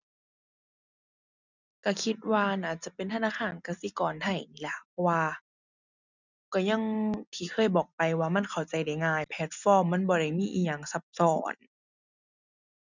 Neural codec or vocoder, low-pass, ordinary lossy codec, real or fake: none; 7.2 kHz; none; real